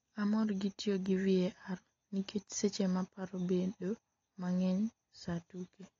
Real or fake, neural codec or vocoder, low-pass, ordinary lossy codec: real; none; 7.2 kHz; AAC, 32 kbps